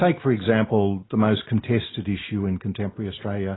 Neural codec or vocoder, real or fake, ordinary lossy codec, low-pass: none; real; AAC, 16 kbps; 7.2 kHz